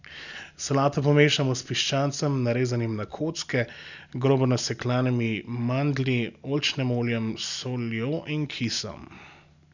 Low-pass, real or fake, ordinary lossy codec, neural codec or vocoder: 7.2 kHz; real; none; none